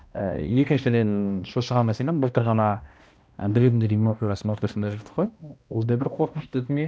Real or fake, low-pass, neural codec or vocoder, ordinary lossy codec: fake; none; codec, 16 kHz, 1 kbps, X-Codec, HuBERT features, trained on balanced general audio; none